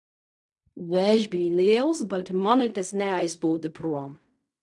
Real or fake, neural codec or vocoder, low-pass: fake; codec, 16 kHz in and 24 kHz out, 0.4 kbps, LongCat-Audio-Codec, fine tuned four codebook decoder; 10.8 kHz